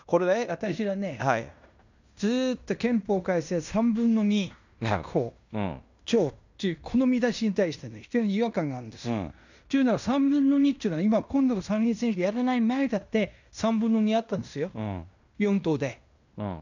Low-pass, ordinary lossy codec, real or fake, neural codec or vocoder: 7.2 kHz; none; fake; codec, 16 kHz in and 24 kHz out, 0.9 kbps, LongCat-Audio-Codec, fine tuned four codebook decoder